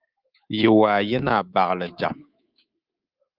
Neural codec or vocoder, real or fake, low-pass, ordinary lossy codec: codec, 24 kHz, 3.1 kbps, DualCodec; fake; 5.4 kHz; Opus, 24 kbps